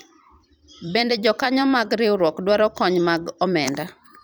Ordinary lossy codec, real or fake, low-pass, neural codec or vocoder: none; fake; none; vocoder, 44.1 kHz, 128 mel bands every 256 samples, BigVGAN v2